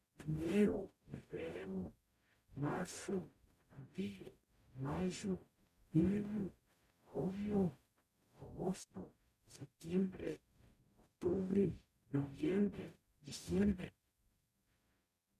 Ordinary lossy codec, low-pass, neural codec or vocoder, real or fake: MP3, 96 kbps; 14.4 kHz; codec, 44.1 kHz, 0.9 kbps, DAC; fake